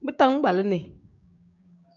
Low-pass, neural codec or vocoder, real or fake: 7.2 kHz; codec, 16 kHz, 6 kbps, DAC; fake